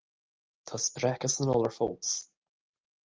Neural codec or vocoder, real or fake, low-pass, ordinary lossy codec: none; real; 7.2 kHz; Opus, 32 kbps